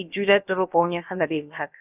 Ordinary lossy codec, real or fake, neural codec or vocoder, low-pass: none; fake; codec, 16 kHz, about 1 kbps, DyCAST, with the encoder's durations; 3.6 kHz